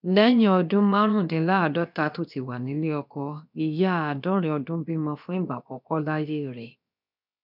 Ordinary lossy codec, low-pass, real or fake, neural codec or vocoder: none; 5.4 kHz; fake; codec, 16 kHz, about 1 kbps, DyCAST, with the encoder's durations